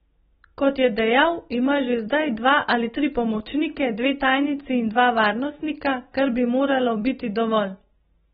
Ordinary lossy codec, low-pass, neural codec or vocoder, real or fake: AAC, 16 kbps; 19.8 kHz; none; real